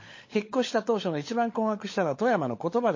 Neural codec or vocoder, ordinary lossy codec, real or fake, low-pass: codec, 16 kHz, 16 kbps, FunCodec, trained on LibriTTS, 50 frames a second; MP3, 32 kbps; fake; 7.2 kHz